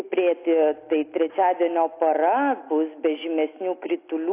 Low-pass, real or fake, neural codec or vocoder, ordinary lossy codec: 3.6 kHz; real; none; AAC, 24 kbps